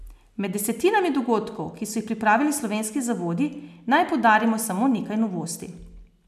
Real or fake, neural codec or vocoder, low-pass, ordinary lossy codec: real; none; 14.4 kHz; none